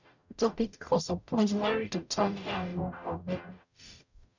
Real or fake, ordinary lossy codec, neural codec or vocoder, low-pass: fake; none; codec, 44.1 kHz, 0.9 kbps, DAC; 7.2 kHz